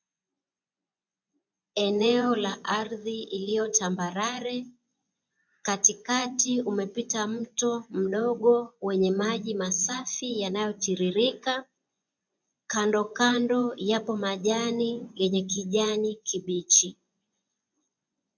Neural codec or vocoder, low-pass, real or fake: vocoder, 24 kHz, 100 mel bands, Vocos; 7.2 kHz; fake